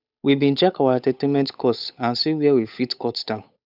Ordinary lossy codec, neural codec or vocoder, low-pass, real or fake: none; codec, 16 kHz, 8 kbps, FunCodec, trained on Chinese and English, 25 frames a second; 5.4 kHz; fake